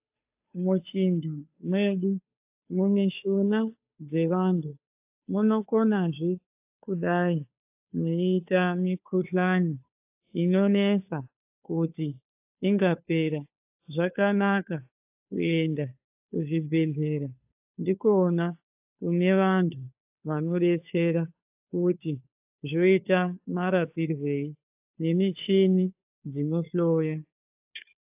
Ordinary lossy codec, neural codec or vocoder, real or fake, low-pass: AAC, 32 kbps; codec, 16 kHz, 2 kbps, FunCodec, trained on Chinese and English, 25 frames a second; fake; 3.6 kHz